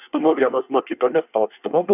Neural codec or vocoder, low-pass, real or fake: codec, 24 kHz, 1 kbps, SNAC; 3.6 kHz; fake